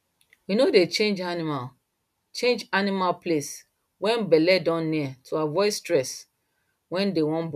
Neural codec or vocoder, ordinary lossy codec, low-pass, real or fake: none; none; 14.4 kHz; real